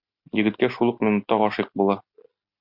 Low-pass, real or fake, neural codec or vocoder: 5.4 kHz; real; none